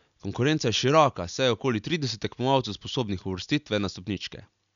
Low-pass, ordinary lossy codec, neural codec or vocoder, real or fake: 7.2 kHz; none; none; real